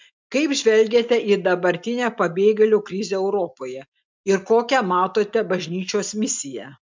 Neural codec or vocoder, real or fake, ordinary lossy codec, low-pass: none; real; MP3, 64 kbps; 7.2 kHz